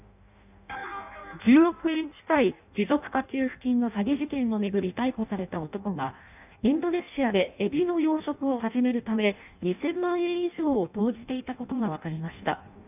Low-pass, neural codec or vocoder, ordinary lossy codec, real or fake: 3.6 kHz; codec, 16 kHz in and 24 kHz out, 0.6 kbps, FireRedTTS-2 codec; none; fake